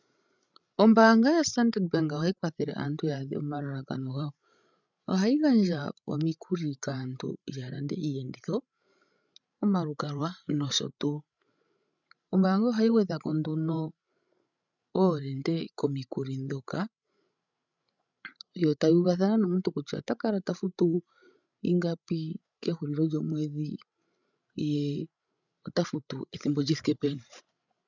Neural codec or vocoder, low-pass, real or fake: codec, 16 kHz, 16 kbps, FreqCodec, larger model; 7.2 kHz; fake